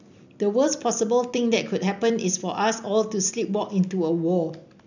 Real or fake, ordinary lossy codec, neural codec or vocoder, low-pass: real; none; none; 7.2 kHz